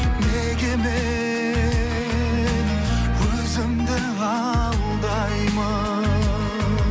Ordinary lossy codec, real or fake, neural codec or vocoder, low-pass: none; real; none; none